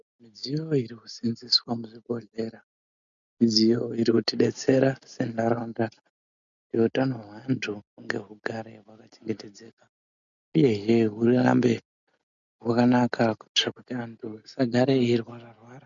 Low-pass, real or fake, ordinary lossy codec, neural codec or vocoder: 7.2 kHz; real; MP3, 96 kbps; none